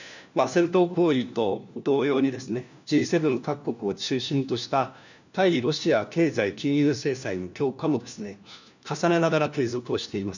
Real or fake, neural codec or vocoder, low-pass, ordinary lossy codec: fake; codec, 16 kHz, 1 kbps, FunCodec, trained on LibriTTS, 50 frames a second; 7.2 kHz; none